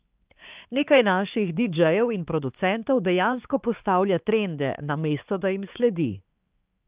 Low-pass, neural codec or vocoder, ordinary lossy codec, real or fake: 3.6 kHz; codec, 16 kHz, 4 kbps, X-Codec, HuBERT features, trained on balanced general audio; Opus, 24 kbps; fake